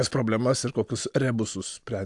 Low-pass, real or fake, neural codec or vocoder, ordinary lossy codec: 10.8 kHz; real; none; AAC, 64 kbps